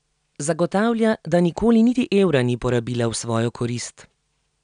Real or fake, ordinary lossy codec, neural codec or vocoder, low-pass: real; none; none; 9.9 kHz